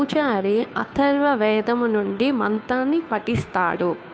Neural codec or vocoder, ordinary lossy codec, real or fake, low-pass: codec, 16 kHz, 2 kbps, FunCodec, trained on Chinese and English, 25 frames a second; none; fake; none